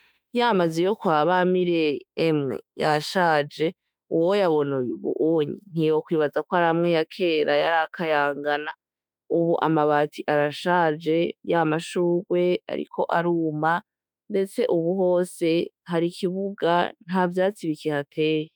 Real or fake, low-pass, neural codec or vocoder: fake; 19.8 kHz; autoencoder, 48 kHz, 32 numbers a frame, DAC-VAE, trained on Japanese speech